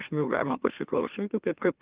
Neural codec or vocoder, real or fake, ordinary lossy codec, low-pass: autoencoder, 44.1 kHz, a latent of 192 numbers a frame, MeloTTS; fake; Opus, 32 kbps; 3.6 kHz